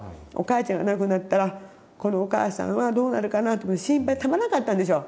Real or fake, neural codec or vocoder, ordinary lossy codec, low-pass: real; none; none; none